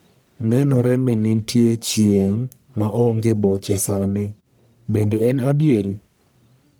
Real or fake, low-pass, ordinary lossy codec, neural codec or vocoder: fake; none; none; codec, 44.1 kHz, 1.7 kbps, Pupu-Codec